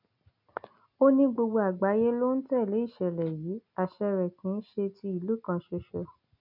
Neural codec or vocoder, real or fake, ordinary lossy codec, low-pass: none; real; none; 5.4 kHz